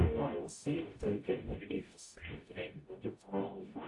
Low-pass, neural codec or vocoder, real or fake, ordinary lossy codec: 10.8 kHz; codec, 44.1 kHz, 0.9 kbps, DAC; fake; AAC, 48 kbps